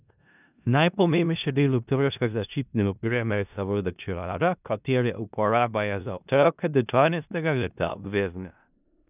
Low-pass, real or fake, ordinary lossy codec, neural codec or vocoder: 3.6 kHz; fake; none; codec, 16 kHz in and 24 kHz out, 0.4 kbps, LongCat-Audio-Codec, four codebook decoder